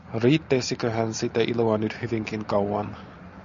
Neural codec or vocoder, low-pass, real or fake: none; 7.2 kHz; real